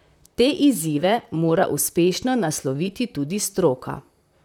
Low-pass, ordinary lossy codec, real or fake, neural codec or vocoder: 19.8 kHz; none; fake; vocoder, 44.1 kHz, 128 mel bands, Pupu-Vocoder